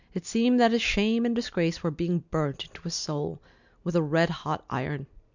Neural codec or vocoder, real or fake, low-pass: none; real; 7.2 kHz